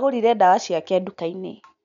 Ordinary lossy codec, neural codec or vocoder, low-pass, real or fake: none; none; 7.2 kHz; real